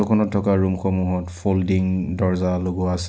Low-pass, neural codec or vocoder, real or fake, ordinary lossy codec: none; none; real; none